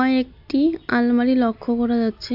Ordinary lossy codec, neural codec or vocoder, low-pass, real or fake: MP3, 48 kbps; none; 5.4 kHz; real